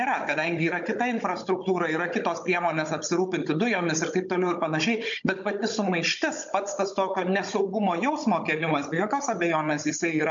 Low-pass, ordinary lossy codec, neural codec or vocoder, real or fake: 7.2 kHz; MP3, 48 kbps; codec, 16 kHz, 16 kbps, FunCodec, trained on Chinese and English, 50 frames a second; fake